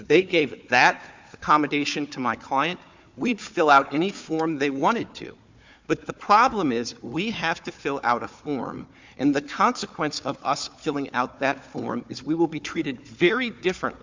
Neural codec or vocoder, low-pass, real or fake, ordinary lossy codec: codec, 16 kHz, 4 kbps, FunCodec, trained on Chinese and English, 50 frames a second; 7.2 kHz; fake; MP3, 64 kbps